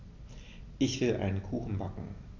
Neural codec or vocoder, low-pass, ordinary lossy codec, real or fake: none; 7.2 kHz; none; real